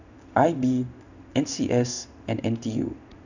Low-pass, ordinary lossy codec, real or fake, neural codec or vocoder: 7.2 kHz; none; fake; codec, 16 kHz in and 24 kHz out, 1 kbps, XY-Tokenizer